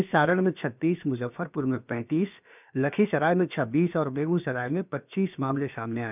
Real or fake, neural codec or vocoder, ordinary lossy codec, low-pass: fake; codec, 16 kHz, about 1 kbps, DyCAST, with the encoder's durations; none; 3.6 kHz